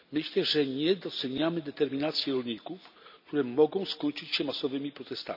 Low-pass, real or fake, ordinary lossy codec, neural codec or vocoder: 5.4 kHz; real; none; none